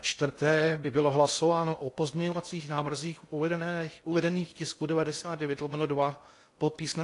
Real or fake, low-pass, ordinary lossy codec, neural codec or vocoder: fake; 10.8 kHz; AAC, 48 kbps; codec, 16 kHz in and 24 kHz out, 0.6 kbps, FocalCodec, streaming, 2048 codes